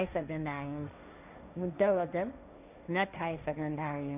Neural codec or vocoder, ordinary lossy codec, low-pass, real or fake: codec, 16 kHz, 1.1 kbps, Voila-Tokenizer; none; 3.6 kHz; fake